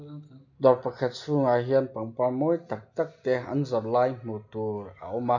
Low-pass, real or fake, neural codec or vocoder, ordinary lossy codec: 7.2 kHz; real; none; none